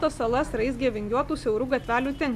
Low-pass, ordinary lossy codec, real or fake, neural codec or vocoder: 14.4 kHz; AAC, 96 kbps; real; none